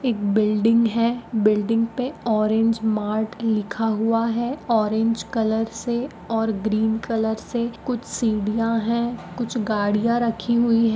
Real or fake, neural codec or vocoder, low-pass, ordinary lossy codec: real; none; none; none